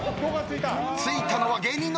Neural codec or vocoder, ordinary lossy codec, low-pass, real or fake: none; none; none; real